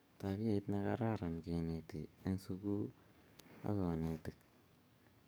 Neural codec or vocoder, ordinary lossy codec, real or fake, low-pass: codec, 44.1 kHz, 7.8 kbps, DAC; none; fake; none